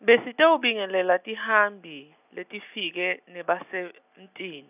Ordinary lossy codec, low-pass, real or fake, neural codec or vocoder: none; 3.6 kHz; real; none